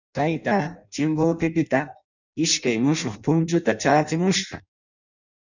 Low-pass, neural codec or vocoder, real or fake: 7.2 kHz; codec, 16 kHz in and 24 kHz out, 0.6 kbps, FireRedTTS-2 codec; fake